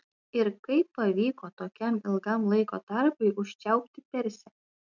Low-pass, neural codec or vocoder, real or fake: 7.2 kHz; none; real